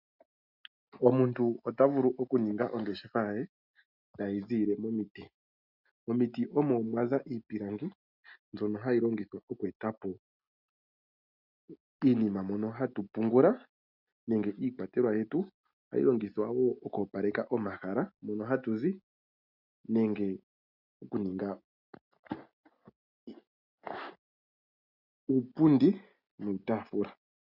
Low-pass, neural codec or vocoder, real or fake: 5.4 kHz; none; real